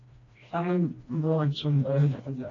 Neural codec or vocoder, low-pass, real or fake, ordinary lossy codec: codec, 16 kHz, 1 kbps, FreqCodec, smaller model; 7.2 kHz; fake; AAC, 32 kbps